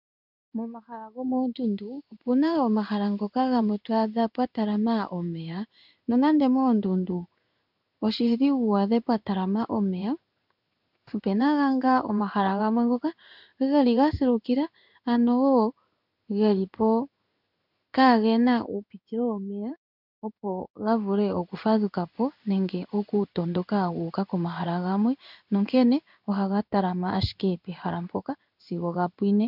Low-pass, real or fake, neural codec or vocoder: 5.4 kHz; fake; codec, 16 kHz in and 24 kHz out, 1 kbps, XY-Tokenizer